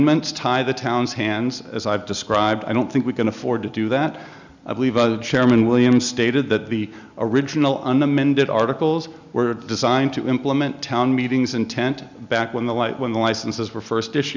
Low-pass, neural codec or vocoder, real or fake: 7.2 kHz; none; real